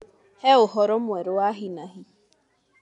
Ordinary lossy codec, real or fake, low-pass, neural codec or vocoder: none; real; 10.8 kHz; none